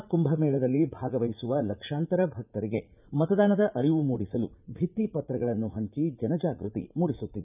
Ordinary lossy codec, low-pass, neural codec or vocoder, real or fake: none; 3.6 kHz; vocoder, 44.1 kHz, 80 mel bands, Vocos; fake